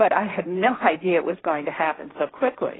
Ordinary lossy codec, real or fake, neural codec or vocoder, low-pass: AAC, 16 kbps; fake; codec, 16 kHz, 1.1 kbps, Voila-Tokenizer; 7.2 kHz